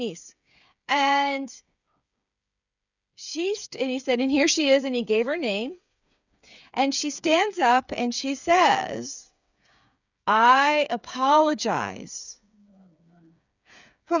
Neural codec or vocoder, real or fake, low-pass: codec, 16 kHz, 8 kbps, FreqCodec, smaller model; fake; 7.2 kHz